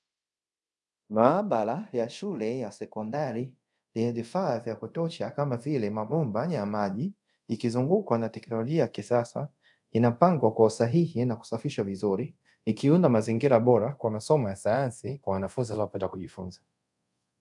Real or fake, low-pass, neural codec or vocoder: fake; 10.8 kHz; codec, 24 kHz, 0.5 kbps, DualCodec